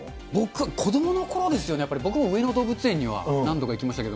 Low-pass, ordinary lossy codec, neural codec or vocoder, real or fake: none; none; none; real